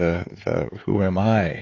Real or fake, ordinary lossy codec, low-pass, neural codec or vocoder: fake; AAC, 32 kbps; 7.2 kHz; codec, 16 kHz, 4 kbps, X-Codec, HuBERT features, trained on LibriSpeech